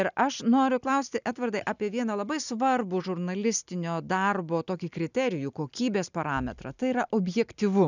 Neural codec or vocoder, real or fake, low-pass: none; real; 7.2 kHz